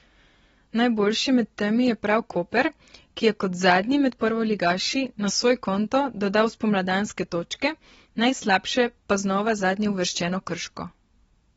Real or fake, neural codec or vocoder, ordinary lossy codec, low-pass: real; none; AAC, 24 kbps; 19.8 kHz